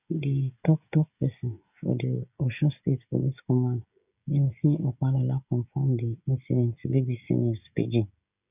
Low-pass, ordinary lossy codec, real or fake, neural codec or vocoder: 3.6 kHz; none; fake; codec, 16 kHz, 16 kbps, FreqCodec, smaller model